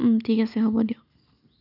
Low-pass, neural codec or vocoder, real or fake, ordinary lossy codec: 5.4 kHz; none; real; none